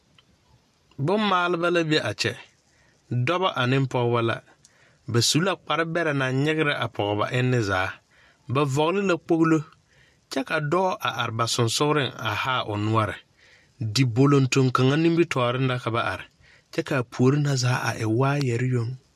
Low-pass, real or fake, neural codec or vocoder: 14.4 kHz; real; none